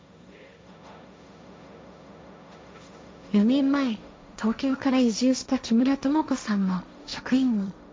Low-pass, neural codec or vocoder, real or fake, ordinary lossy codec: none; codec, 16 kHz, 1.1 kbps, Voila-Tokenizer; fake; none